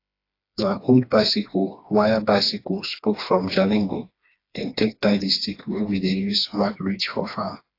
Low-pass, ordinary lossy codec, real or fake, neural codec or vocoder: 5.4 kHz; AAC, 32 kbps; fake; codec, 16 kHz, 2 kbps, FreqCodec, smaller model